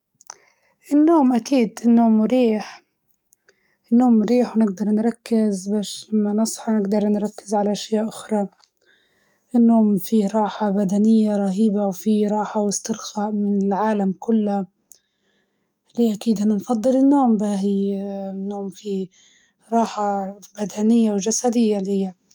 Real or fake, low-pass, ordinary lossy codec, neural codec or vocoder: fake; 19.8 kHz; none; codec, 44.1 kHz, 7.8 kbps, DAC